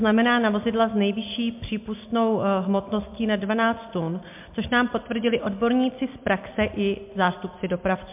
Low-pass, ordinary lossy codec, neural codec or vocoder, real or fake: 3.6 kHz; MP3, 32 kbps; none; real